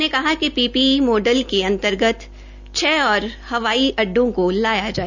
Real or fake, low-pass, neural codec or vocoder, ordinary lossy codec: real; 7.2 kHz; none; none